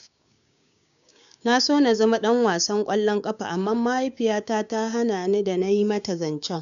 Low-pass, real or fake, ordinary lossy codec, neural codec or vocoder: 7.2 kHz; fake; none; codec, 16 kHz, 6 kbps, DAC